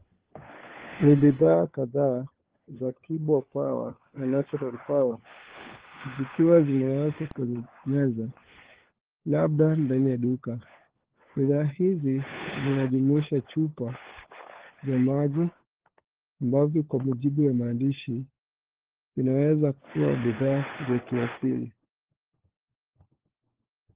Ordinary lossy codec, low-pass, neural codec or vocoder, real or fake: Opus, 16 kbps; 3.6 kHz; codec, 16 kHz, 4 kbps, FunCodec, trained on LibriTTS, 50 frames a second; fake